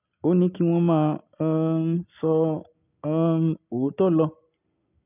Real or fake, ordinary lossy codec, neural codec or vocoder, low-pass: real; none; none; 3.6 kHz